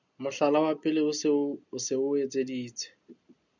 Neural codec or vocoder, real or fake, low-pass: none; real; 7.2 kHz